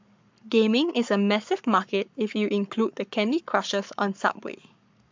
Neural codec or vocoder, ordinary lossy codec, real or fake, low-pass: codec, 44.1 kHz, 7.8 kbps, Pupu-Codec; MP3, 64 kbps; fake; 7.2 kHz